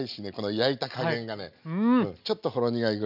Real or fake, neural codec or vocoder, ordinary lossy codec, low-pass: real; none; none; 5.4 kHz